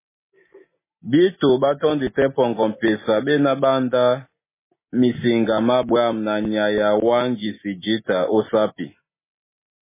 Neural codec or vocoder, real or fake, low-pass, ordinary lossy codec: none; real; 3.6 kHz; MP3, 16 kbps